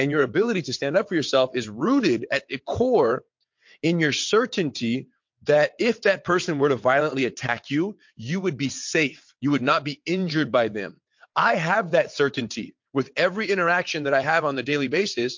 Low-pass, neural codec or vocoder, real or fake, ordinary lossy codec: 7.2 kHz; vocoder, 22.05 kHz, 80 mel bands, WaveNeXt; fake; MP3, 48 kbps